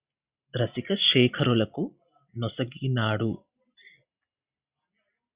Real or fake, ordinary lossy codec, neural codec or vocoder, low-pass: real; Opus, 64 kbps; none; 3.6 kHz